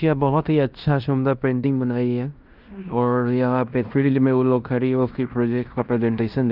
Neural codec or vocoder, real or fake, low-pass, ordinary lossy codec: codec, 16 kHz in and 24 kHz out, 0.9 kbps, LongCat-Audio-Codec, fine tuned four codebook decoder; fake; 5.4 kHz; Opus, 24 kbps